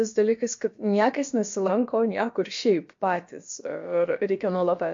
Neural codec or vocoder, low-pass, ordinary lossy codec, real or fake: codec, 16 kHz, about 1 kbps, DyCAST, with the encoder's durations; 7.2 kHz; MP3, 48 kbps; fake